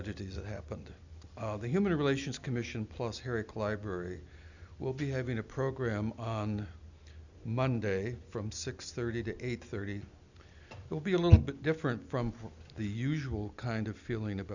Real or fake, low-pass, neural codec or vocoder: real; 7.2 kHz; none